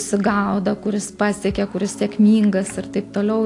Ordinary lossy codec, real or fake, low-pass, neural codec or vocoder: AAC, 48 kbps; real; 10.8 kHz; none